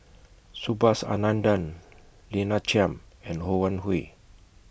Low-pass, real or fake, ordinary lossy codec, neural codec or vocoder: none; real; none; none